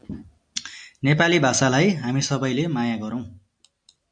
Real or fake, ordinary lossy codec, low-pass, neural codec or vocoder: real; MP3, 96 kbps; 9.9 kHz; none